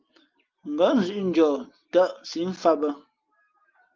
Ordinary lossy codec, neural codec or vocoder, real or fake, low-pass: Opus, 32 kbps; none; real; 7.2 kHz